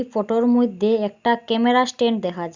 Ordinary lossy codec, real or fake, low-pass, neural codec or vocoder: Opus, 64 kbps; real; 7.2 kHz; none